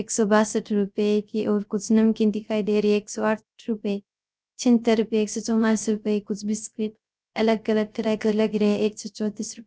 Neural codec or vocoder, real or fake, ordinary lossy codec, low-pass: codec, 16 kHz, 0.3 kbps, FocalCodec; fake; none; none